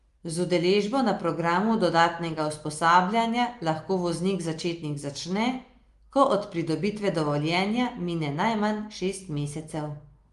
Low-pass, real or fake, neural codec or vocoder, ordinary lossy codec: 10.8 kHz; real; none; Opus, 32 kbps